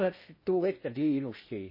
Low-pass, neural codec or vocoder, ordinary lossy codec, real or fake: 5.4 kHz; codec, 16 kHz in and 24 kHz out, 0.6 kbps, FocalCodec, streaming, 4096 codes; MP3, 24 kbps; fake